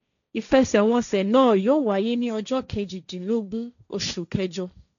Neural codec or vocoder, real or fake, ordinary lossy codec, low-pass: codec, 16 kHz, 1.1 kbps, Voila-Tokenizer; fake; none; 7.2 kHz